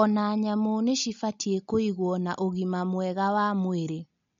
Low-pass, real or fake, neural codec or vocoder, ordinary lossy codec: 7.2 kHz; real; none; MP3, 48 kbps